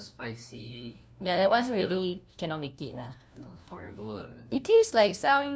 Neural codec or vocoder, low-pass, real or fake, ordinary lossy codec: codec, 16 kHz, 1 kbps, FunCodec, trained on LibriTTS, 50 frames a second; none; fake; none